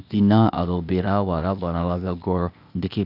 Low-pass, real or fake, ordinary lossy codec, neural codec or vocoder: 5.4 kHz; fake; none; codec, 16 kHz, 0.8 kbps, ZipCodec